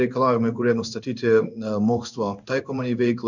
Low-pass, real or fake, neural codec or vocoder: 7.2 kHz; fake; codec, 16 kHz in and 24 kHz out, 1 kbps, XY-Tokenizer